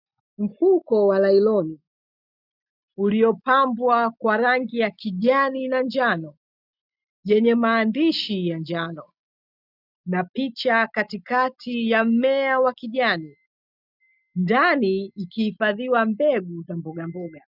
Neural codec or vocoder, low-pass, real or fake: none; 5.4 kHz; real